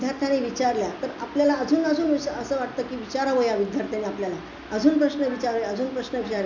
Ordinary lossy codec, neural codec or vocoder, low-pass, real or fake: none; none; 7.2 kHz; real